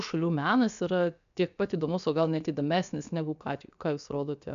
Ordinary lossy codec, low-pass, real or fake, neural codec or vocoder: MP3, 96 kbps; 7.2 kHz; fake; codec, 16 kHz, 0.7 kbps, FocalCodec